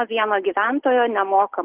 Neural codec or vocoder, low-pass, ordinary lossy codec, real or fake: none; 3.6 kHz; Opus, 16 kbps; real